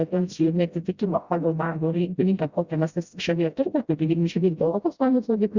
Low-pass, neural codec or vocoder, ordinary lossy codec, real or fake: 7.2 kHz; codec, 16 kHz, 0.5 kbps, FreqCodec, smaller model; Opus, 64 kbps; fake